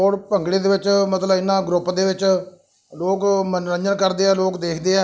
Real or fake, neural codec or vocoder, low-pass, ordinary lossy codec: real; none; none; none